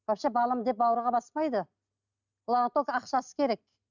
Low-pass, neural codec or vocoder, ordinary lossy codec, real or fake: 7.2 kHz; none; none; real